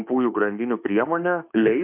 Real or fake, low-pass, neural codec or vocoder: fake; 3.6 kHz; autoencoder, 48 kHz, 32 numbers a frame, DAC-VAE, trained on Japanese speech